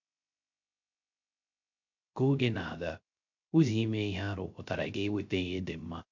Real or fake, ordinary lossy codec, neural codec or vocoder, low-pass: fake; MP3, 48 kbps; codec, 16 kHz, 0.3 kbps, FocalCodec; 7.2 kHz